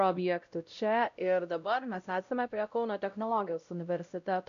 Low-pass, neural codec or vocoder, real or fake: 7.2 kHz; codec, 16 kHz, 0.5 kbps, X-Codec, WavLM features, trained on Multilingual LibriSpeech; fake